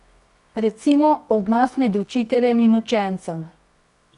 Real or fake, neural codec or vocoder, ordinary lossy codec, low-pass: fake; codec, 24 kHz, 0.9 kbps, WavTokenizer, medium music audio release; none; 10.8 kHz